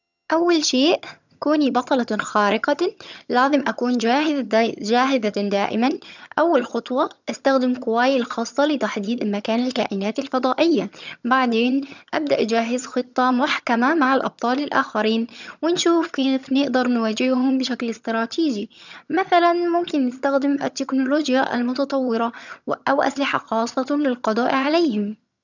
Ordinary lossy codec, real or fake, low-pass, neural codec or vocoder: none; fake; 7.2 kHz; vocoder, 22.05 kHz, 80 mel bands, HiFi-GAN